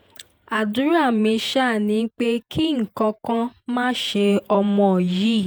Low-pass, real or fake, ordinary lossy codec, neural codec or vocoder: none; fake; none; vocoder, 48 kHz, 128 mel bands, Vocos